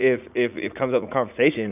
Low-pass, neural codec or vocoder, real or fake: 3.6 kHz; vocoder, 44.1 kHz, 80 mel bands, Vocos; fake